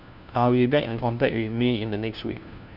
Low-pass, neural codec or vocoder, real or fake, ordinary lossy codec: 5.4 kHz; codec, 16 kHz, 1 kbps, FunCodec, trained on LibriTTS, 50 frames a second; fake; none